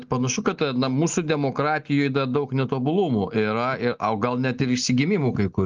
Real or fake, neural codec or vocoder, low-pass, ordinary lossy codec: real; none; 7.2 kHz; Opus, 24 kbps